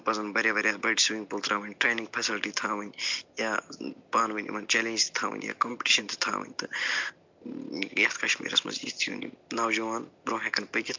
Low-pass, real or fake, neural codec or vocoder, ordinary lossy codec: 7.2 kHz; real; none; MP3, 64 kbps